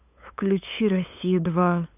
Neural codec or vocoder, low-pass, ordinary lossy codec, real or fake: codec, 16 kHz, 8 kbps, FunCodec, trained on LibriTTS, 25 frames a second; 3.6 kHz; none; fake